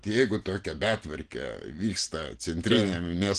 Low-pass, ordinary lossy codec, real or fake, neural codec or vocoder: 9.9 kHz; Opus, 16 kbps; real; none